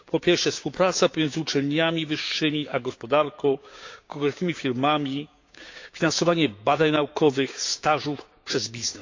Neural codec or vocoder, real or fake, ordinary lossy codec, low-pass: codec, 16 kHz, 6 kbps, DAC; fake; AAC, 48 kbps; 7.2 kHz